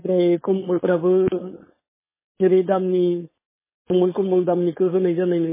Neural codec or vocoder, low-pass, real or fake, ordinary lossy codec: codec, 16 kHz, 4.8 kbps, FACodec; 3.6 kHz; fake; MP3, 16 kbps